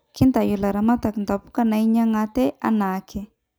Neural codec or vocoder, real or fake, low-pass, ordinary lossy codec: none; real; none; none